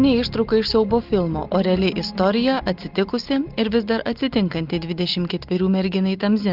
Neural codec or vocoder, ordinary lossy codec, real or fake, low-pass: none; Opus, 32 kbps; real; 5.4 kHz